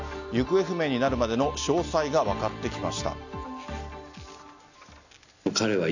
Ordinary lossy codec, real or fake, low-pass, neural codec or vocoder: none; real; 7.2 kHz; none